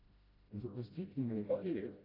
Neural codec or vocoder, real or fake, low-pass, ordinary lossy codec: codec, 16 kHz, 0.5 kbps, FreqCodec, smaller model; fake; 5.4 kHz; AAC, 24 kbps